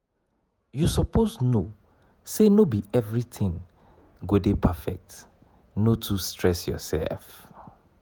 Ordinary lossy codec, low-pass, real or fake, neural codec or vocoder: none; none; fake; vocoder, 48 kHz, 128 mel bands, Vocos